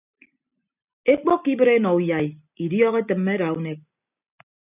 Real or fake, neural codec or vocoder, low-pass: real; none; 3.6 kHz